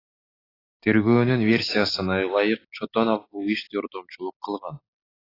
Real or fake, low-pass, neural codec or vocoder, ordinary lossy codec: real; 5.4 kHz; none; AAC, 24 kbps